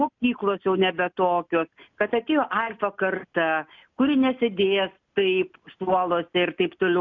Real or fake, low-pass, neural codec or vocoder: real; 7.2 kHz; none